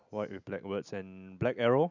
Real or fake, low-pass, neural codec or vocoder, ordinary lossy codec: real; 7.2 kHz; none; none